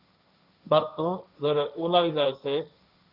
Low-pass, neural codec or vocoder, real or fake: 5.4 kHz; codec, 16 kHz, 1.1 kbps, Voila-Tokenizer; fake